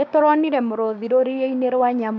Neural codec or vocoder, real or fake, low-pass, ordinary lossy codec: codec, 16 kHz, 6 kbps, DAC; fake; none; none